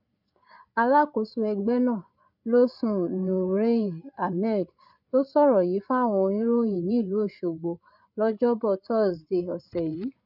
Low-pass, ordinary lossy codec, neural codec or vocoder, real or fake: 5.4 kHz; none; codec, 16 kHz, 8 kbps, FreqCodec, larger model; fake